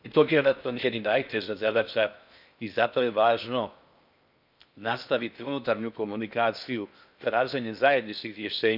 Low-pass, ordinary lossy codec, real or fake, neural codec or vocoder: 5.4 kHz; none; fake; codec, 16 kHz in and 24 kHz out, 0.6 kbps, FocalCodec, streaming, 4096 codes